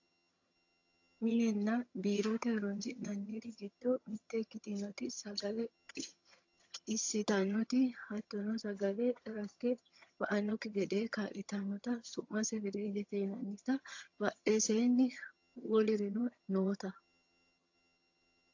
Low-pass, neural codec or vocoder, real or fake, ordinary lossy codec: 7.2 kHz; vocoder, 22.05 kHz, 80 mel bands, HiFi-GAN; fake; AAC, 48 kbps